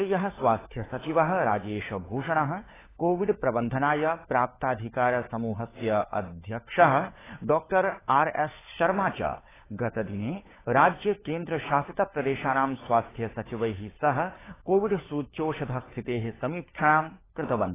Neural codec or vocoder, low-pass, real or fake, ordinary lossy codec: codec, 16 kHz, 4 kbps, FunCodec, trained on LibriTTS, 50 frames a second; 3.6 kHz; fake; AAC, 16 kbps